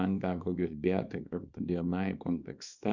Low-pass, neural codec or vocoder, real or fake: 7.2 kHz; codec, 24 kHz, 0.9 kbps, WavTokenizer, small release; fake